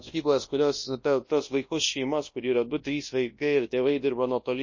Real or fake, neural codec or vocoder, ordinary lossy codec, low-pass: fake; codec, 24 kHz, 0.9 kbps, WavTokenizer, large speech release; MP3, 32 kbps; 7.2 kHz